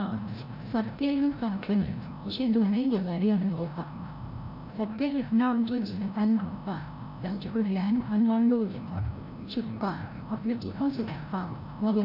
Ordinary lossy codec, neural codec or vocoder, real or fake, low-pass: none; codec, 16 kHz, 0.5 kbps, FreqCodec, larger model; fake; 5.4 kHz